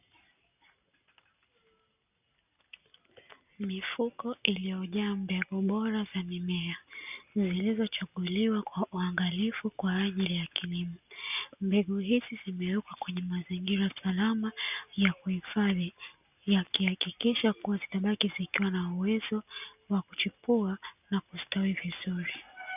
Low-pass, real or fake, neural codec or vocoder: 3.6 kHz; real; none